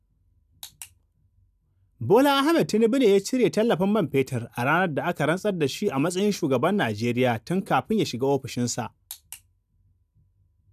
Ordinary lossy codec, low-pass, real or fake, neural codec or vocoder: none; 14.4 kHz; real; none